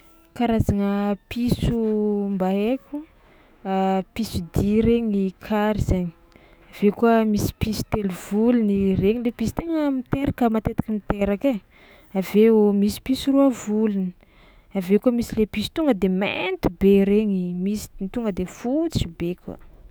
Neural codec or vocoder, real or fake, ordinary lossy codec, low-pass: autoencoder, 48 kHz, 128 numbers a frame, DAC-VAE, trained on Japanese speech; fake; none; none